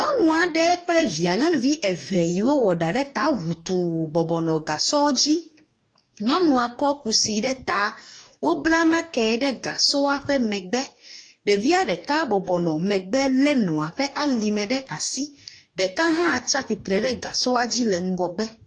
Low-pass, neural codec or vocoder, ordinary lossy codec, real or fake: 9.9 kHz; codec, 44.1 kHz, 2.6 kbps, DAC; AAC, 48 kbps; fake